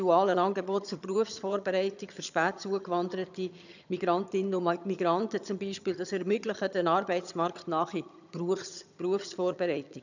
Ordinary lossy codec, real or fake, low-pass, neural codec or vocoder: none; fake; 7.2 kHz; vocoder, 22.05 kHz, 80 mel bands, HiFi-GAN